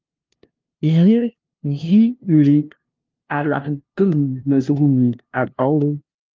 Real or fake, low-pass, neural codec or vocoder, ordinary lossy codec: fake; 7.2 kHz; codec, 16 kHz, 0.5 kbps, FunCodec, trained on LibriTTS, 25 frames a second; Opus, 32 kbps